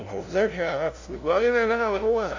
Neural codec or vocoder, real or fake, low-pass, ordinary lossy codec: codec, 16 kHz, 0.5 kbps, FunCodec, trained on LibriTTS, 25 frames a second; fake; 7.2 kHz; AAC, 48 kbps